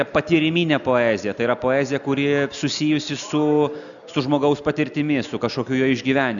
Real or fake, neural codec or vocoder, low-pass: real; none; 7.2 kHz